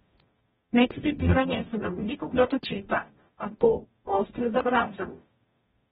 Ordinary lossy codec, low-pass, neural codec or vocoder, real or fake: AAC, 16 kbps; 19.8 kHz; codec, 44.1 kHz, 0.9 kbps, DAC; fake